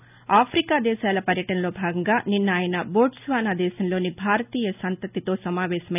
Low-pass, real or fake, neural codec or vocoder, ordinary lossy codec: 3.6 kHz; real; none; none